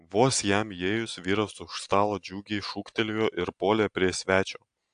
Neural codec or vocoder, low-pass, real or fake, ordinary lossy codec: none; 9.9 kHz; real; AAC, 64 kbps